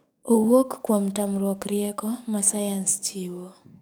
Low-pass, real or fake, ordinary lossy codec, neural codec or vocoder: none; fake; none; codec, 44.1 kHz, 7.8 kbps, DAC